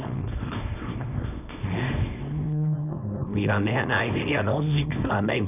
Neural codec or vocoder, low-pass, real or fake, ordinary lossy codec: codec, 24 kHz, 0.9 kbps, WavTokenizer, small release; 3.6 kHz; fake; none